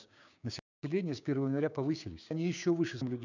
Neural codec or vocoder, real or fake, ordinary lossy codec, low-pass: codec, 16 kHz, 6 kbps, DAC; fake; none; 7.2 kHz